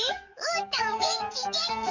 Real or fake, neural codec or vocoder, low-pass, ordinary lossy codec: fake; codec, 44.1 kHz, 7.8 kbps, DAC; 7.2 kHz; none